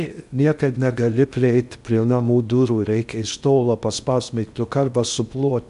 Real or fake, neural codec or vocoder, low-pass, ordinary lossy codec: fake; codec, 16 kHz in and 24 kHz out, 0.6 kbps, FocalCodec, streaming, 2048 codes; 10.8 kHz; AAC, 96 kbps